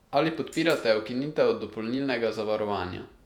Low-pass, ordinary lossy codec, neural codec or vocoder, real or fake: 19.8 kHz; none; vocoder, 48 kHz, 128 mel bands, Vocos; fake